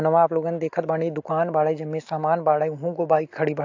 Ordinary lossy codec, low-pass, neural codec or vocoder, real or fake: AAC, 48 kbps; 7.2 kHz; none; real